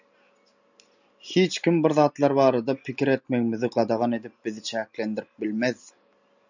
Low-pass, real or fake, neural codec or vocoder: 7.2 kHz; real; none